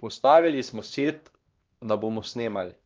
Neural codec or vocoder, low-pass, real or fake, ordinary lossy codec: codec, 16 kHz, 1 kbps, X-Codec, HuBERT features, trained on LibriSpeech; 7.2 kHz; fake; Opus, 24 kbps